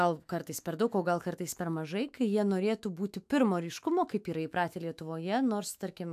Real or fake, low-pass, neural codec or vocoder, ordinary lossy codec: fake; 14.4 kHz; autoencoder, 48 kHz, 128 numbers a frame, DAC-VAE, trained on Japanese speech; AAC, 96 kbps